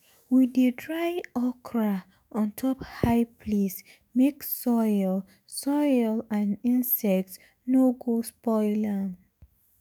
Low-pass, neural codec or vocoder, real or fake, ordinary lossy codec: none; autoencoder, 48 kHz, 128 numbers a frame, DAC-VAE, trained on Japanese speech; fake; none